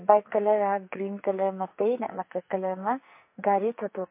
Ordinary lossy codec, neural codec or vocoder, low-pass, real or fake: MP3, 24 kbps; codec, 44.1 kHz, 2.6 kbps, SNAC; 3.6 kHz; fake